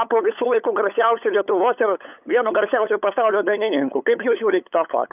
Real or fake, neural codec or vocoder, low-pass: fake; codec, 16 kHz, 8 kbps, FunCodec, trained on LibriTTS, 25 frames a second; 3.6 kHz